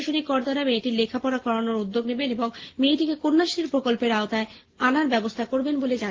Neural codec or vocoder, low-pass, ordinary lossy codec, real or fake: none; 7.2 kHz; Opus, 16 kbps; real